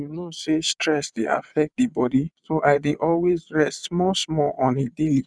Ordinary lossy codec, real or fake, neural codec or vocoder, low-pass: none; fake; vocoder, 22.05 kHz, 80 mel bands, WaveNeXt; none